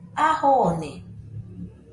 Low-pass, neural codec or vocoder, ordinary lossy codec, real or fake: 10.8 kHz; none; MP3, 48 kbps; real